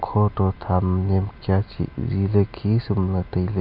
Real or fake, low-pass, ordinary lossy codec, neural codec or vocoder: real; 5.4 kHz; AAC, 48 kbps; none